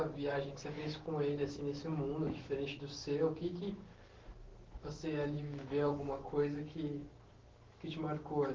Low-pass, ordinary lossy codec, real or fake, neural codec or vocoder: 7.2 kHz; Opus, 16 kbps; fake; autoencoder, 48 kHz, 128 numbers a frame, DAC-VAE, trained on Japanese speech